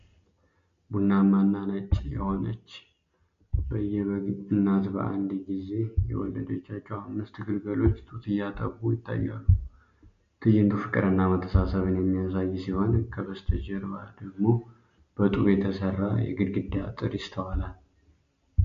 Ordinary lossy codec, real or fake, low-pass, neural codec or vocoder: MP3, 48 kbps; real; 7.2 kHz; none